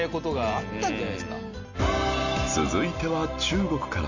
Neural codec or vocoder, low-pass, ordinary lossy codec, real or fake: none; 7.2 kHz; none; real